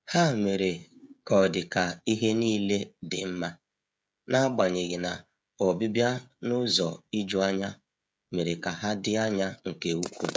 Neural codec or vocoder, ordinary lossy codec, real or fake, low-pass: codec, 16 kHz, 16 kbps, FreqCodec, smaller model; none; fake; none